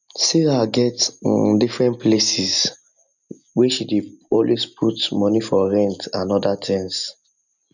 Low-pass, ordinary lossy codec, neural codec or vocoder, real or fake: 7.2 kHz; none; none; real